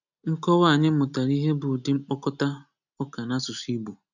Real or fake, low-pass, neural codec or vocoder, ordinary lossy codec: real; 7.2 kHz; none; none